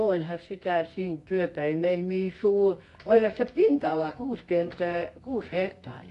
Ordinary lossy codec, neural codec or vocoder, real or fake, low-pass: AAC, 48 kbps; codec, 24 kHz, 0.9 kbps, WavTokenizer, medium music audio release; fake; 10.8 kHz